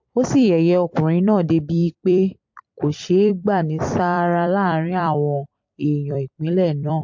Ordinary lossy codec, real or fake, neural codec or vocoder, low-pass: MP3, 48 kbps; fake; vocoder, 44.1 kHz, 128 mel bands every 256 samples, BigVGAN v2; 7.2 kHz